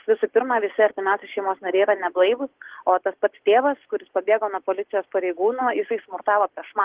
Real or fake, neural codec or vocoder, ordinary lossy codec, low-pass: real; none; Opus, 16 kbps; 3.6 kHz